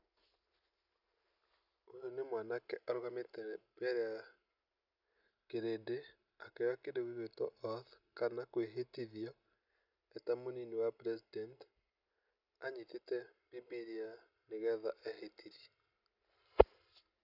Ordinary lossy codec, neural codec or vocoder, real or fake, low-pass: none; none; real; 5.4 kHz